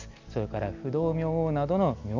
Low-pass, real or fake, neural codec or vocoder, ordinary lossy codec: 7.2 kHz; real; none; none